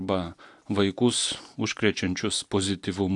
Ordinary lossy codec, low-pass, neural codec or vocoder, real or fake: AAC, 64 kbps; 10.8 kHz; none; real